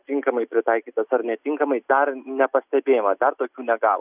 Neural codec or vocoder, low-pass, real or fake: none; 3.6 kHz; real